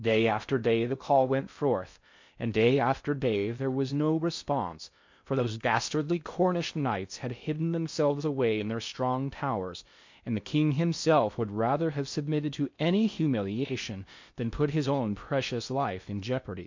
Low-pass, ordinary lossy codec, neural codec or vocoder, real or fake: 7.2 kHz; MP3, 48 kbps; codec, 16 kHz in and 24 kHz out, 0.6 kbps, FocalCodec, streaming, 4096 codes; fake